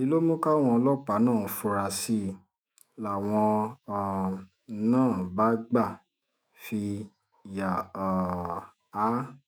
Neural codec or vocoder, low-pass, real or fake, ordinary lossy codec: autoencoder, 48 kHz, 128 numbers a frame, DAC-VAE, trained on Japanese speech; none; fake; none